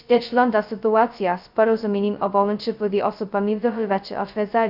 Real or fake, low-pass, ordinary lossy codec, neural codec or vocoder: fake; 5.4 kHz; none; codec, 16 kHz, 0.2 kbps, FocalCodec